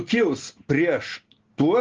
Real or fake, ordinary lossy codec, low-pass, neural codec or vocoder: real; Opus, 16 kbps; 7.2 kHz; none